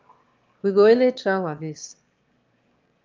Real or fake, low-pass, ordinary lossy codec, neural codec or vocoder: fake; 7.2 kHz; Opus, 24 kbps; autoencoder, 22.05 kHz, a latent of 192 numbers a frame, VITS, trained on one speaker